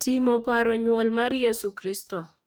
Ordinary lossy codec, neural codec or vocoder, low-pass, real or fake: none; codec, 44.1 kHz, 2.6 kbps, DAC; none; fake